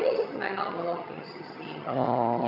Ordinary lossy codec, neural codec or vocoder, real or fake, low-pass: none; vocoder, 22.05 kHz, 80 mel bands, HiFi-GAN; fake; 5.4 kHz